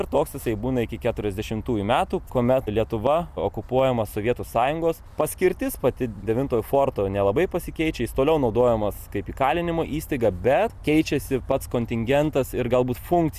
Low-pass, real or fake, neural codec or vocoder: 14.4 kHz; real; none